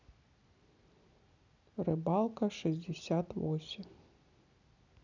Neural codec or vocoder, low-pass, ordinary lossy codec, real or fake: none; 7.2 kHz; none; real